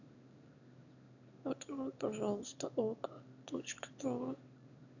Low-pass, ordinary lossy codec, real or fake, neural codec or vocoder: 7.2 kHz; none; fake; autoencoder, 22.05 kHz, a latent of 192 numbers a frame, VITS, trained on one speaker